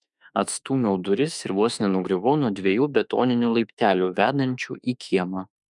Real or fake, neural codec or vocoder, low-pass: fake; autoencoder, 48 kHz, 32 numbers a frame, DAC-VAE, trained on Japanese speech; 10.8 kHz